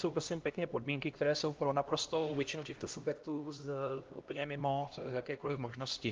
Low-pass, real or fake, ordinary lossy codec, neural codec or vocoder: 7.2 kHz; fake; Opus, 32 kbps; codec, 16 kHz, 1 kbps, X-Codec, HuBERT features, trained on LibriSpeech